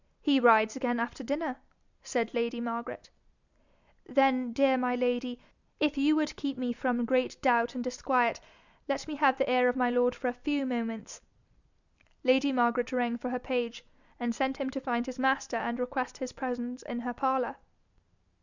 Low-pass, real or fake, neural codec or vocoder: 7.2 kHz; real; none